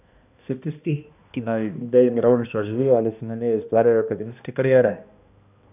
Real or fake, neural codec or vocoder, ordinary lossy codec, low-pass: fake; codec, 16 kHz, 1 kbps, X-Codec, HuBERT features, trained on balanced general audio; none; 3.6 kHz